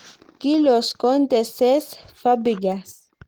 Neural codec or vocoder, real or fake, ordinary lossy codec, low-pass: none; real; Opus, 16 kbps; 19.8 kHz